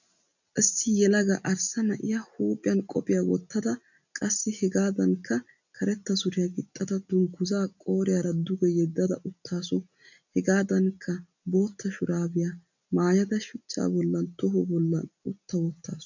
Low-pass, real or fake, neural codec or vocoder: 7.2 kHz; real; none